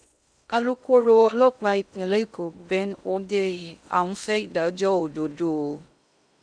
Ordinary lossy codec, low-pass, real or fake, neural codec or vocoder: none; 9.9 kHz; fake; codec, 16 kHz in and 24 kHz out, 0.6 kbps, FocalCodec, streaming, 2048 codes